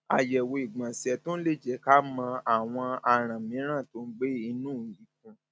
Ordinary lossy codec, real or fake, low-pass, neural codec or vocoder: none; real; none; none